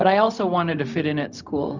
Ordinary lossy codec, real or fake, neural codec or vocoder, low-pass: Opus, 64 kbps; fake; codec, 16 kHz, 0.4 kbps, LongCat-Audio-Codec; 7.2 kHz